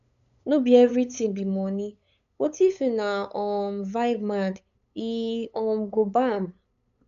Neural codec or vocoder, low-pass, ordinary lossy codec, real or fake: codec, 16 kHz, 8 kbps, FunCodec, trained on LibriTTS, 25 frames a second; 7.2 kHz; none; fake